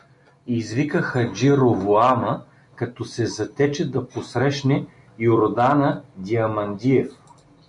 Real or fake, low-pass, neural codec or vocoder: real; 10.8 kHz; none